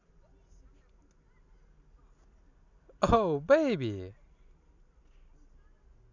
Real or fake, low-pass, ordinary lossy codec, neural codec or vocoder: real; 7.2 kHz; none; none